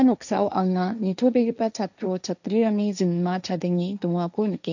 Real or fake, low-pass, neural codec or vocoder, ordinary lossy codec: fake; 7.2 kHz; codec, 16 kHz, 1.1 kbps, Voila-Tokenizer; none